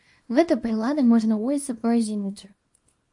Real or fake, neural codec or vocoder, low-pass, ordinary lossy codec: fake; codec, 24 kHz, 0.9 kbps, WavTokenizer, small release; 10.8 kHz; MP3, 48 kbps